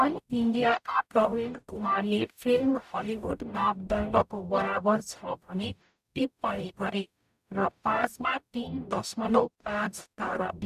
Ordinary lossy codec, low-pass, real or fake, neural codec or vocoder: none; 14.4 kHz; fake; codec, 44.1 kHz, 0.9 kbps, DAC